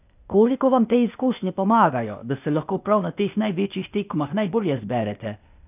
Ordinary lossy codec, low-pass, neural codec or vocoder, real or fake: none; 3.6 kHz; codec, 16 kHz, 0.8 kbps, ZipCodec; fake